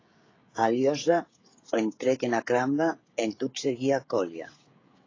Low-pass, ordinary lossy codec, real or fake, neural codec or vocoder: 7.2 kHz; AAC, 32 kbps; fake; codec, 16 kHz, 16 kbps, FreqCodec, smaller model